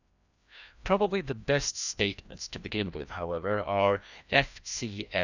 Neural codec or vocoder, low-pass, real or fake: codec, 16 kHz, 1 kbps, FreqCodec, larger model; 7.2 kHz; fake